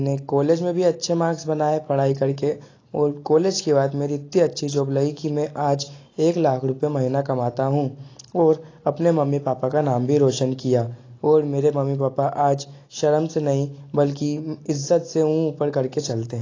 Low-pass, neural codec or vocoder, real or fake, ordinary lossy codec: 7.2 kHz; none; real; AAC, 32 kbps